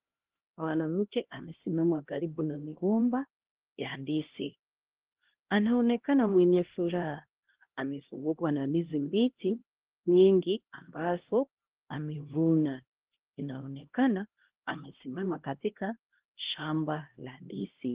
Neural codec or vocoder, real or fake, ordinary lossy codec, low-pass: codec, 16 kHz, 1 kbps, X-Codec, HuBERT features, trained on LibriSpeech; fake; Opus, 16 kbps; 3.6 kHz